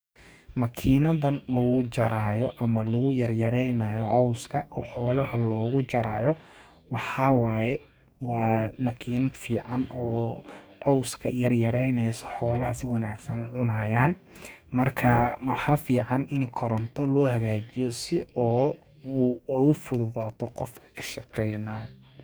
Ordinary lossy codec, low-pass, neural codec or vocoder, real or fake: none; none; codec, 44.1 kHz, 2.6 kbps, DAC; fake